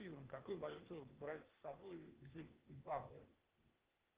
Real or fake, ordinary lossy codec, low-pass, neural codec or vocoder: fake; Opus, 16 kbps; 3.6 kHz; codec, 16 kHz, 0.8 kbps, ZipCodec